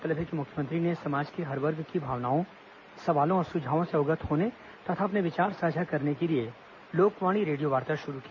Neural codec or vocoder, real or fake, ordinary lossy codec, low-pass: none; real; none; 7.2 kHz